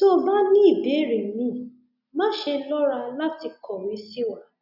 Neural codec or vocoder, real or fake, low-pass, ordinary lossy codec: none; real; 5.4 kHz; none